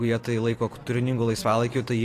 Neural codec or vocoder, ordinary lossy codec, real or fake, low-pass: vocoder, 44.1 kHz, 128 mel bands every 512 samples, BigVGAN v2; AAC, 48 kbps; fake; 14.4 kHz